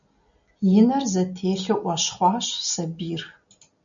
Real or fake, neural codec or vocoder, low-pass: real; none; 7.2 kHz